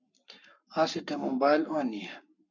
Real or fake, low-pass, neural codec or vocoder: fake; 7.2 kHz; codec, 44.1 kHz, 7.8 kbps, Pupu-Codec